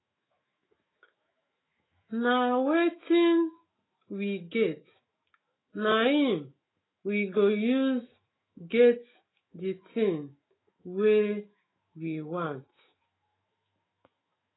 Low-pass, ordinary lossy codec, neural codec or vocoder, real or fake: 7.2 kHz; AAC, 16 kbps; autoencoder, 48 kHz, 128 numbers a frame, DAC-VAE, trained on Japanese speech; fake